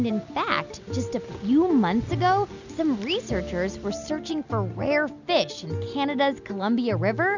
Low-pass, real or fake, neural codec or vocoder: 7.2 kHz; real; none